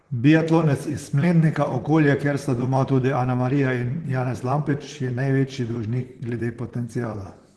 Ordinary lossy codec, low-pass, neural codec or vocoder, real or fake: Opus, 16 kbps; 10.8 kHz; vocoder, 44.1 kHz, 128 mel bands, Pupu-Vocoder; fake